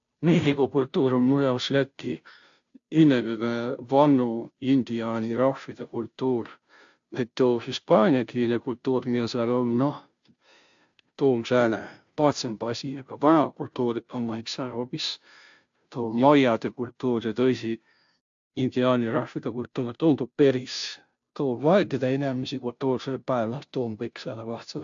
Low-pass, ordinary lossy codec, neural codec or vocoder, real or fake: 7.2 kHz; AAC, 64 kbps; codec, 16 kHz, 0.5 kbps, FunCodec, trained on Chinese and English, 25 frames a second; fake